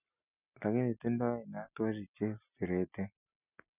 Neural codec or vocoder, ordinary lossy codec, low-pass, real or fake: none; none; 3.6 kHz; real